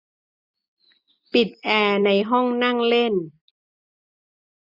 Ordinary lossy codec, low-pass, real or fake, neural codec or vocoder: none; 5.4 kHz; real; none